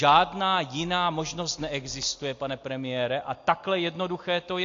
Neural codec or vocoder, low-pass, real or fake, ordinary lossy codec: none; 7.2 kHz; real; AAC, 48 kbps